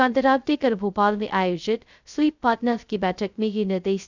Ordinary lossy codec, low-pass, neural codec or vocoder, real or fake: none; 7.2 kHz; codec, 16 kHz, 0.2 kbps, FocalCodec; fake